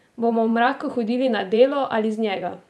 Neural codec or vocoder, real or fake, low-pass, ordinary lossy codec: vocoder, 24 kHz, 100 mel bands, Vocos; fake; none; none